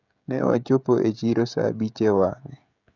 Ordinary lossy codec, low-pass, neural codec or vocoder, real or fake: none; 7.2 kHz; codec, 16 kHz, 16 kbps, FreqCodec, smaller model; fake